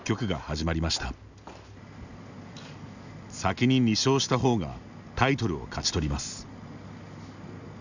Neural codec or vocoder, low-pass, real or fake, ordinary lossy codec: none; 7.2 kHz; real; none